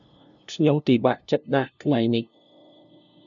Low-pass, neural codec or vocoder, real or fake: 7.2 kHz; codec, 16 kHz, 0.5 kbps, FunCodec, trained on LibriTTS, 25 frames a second; fake